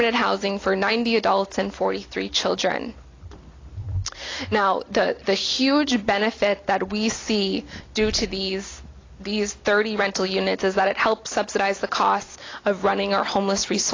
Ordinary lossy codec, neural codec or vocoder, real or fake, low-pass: AAC, 32 kbps; none; real; 7.2 kHz